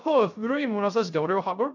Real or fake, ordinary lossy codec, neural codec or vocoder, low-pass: fake; none; codec, 16 kHz, 0.3 kbps, FocalCodec; 7.2 kHz